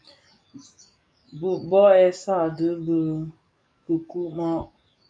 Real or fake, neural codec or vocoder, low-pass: fake; codec, 44.1 kHz, 7.8 kbps, DAC; 9.9 kHz